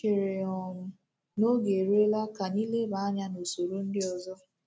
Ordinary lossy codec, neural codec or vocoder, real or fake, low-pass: none; none; real; none